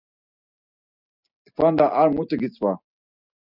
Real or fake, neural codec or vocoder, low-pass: real; none; 5.4 kHz